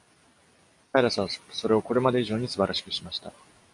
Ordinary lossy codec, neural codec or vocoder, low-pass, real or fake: AAC, 64 kbps; none; 10.8 kHz; real